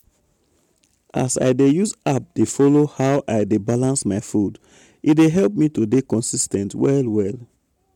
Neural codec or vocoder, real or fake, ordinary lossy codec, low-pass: none; real; MP3, 96 kbps; 19.8 kHz